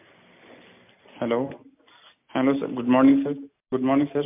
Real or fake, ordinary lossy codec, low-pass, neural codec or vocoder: real; MP3, 32 kbps; 3.6 kHz; none